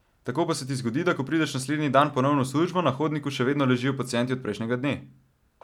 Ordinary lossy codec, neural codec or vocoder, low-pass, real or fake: none; none; 19.8 kHz; real